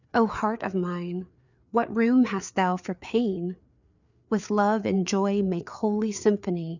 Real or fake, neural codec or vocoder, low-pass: fake; codec, 16 kHz, 4 kbps, FreqCodec, larger model; 7.2 kHz